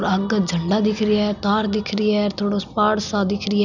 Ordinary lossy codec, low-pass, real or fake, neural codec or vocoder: none; 7.2 kHz; real; none